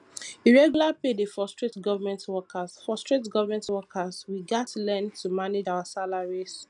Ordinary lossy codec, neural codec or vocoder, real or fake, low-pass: none; none; real; 10.8 kHz